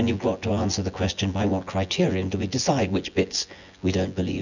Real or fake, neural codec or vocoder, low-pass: fake; vocoder, 24 kHz, 100 mel bands, Vocos; 7.2 kHz